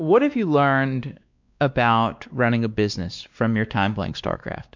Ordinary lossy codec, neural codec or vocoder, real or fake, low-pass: MP3, 64 kbps; codec, 16 kHz, 2 kbps, X-Codec, WavLM features, trained on Multilingual LibriSpeech; fake; 7.2 kHz